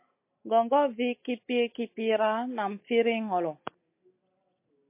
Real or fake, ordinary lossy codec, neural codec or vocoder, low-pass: real; MP3, 24 kbps; none; 3.6 kHz